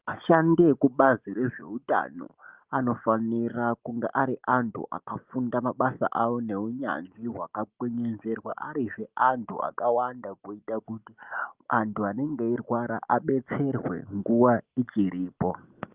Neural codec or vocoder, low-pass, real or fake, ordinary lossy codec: none; 3.6 kHz; real; Opus, 32 kbps